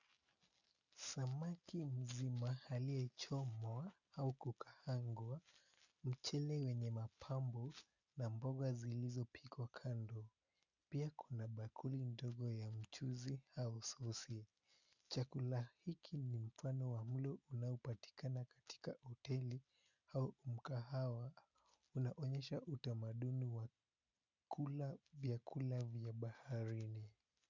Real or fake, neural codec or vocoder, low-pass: real; none; 7.2 kHz